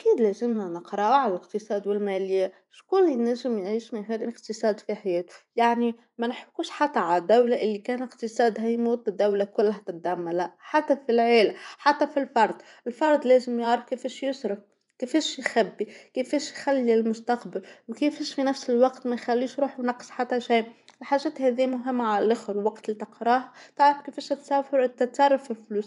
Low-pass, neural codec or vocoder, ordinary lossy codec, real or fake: 10.8 kHz; vocoder, 24 kHz, 100 mel bands, Vocos; none; fake